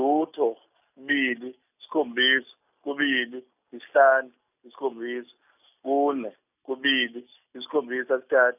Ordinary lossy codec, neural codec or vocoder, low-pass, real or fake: none; none; 3.6 kHz; real